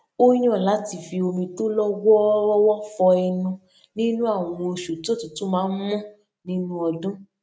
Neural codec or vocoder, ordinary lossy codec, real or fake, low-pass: none; none; real; none